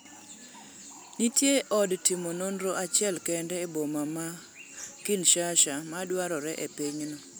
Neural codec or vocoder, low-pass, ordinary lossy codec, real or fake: none; none; none; real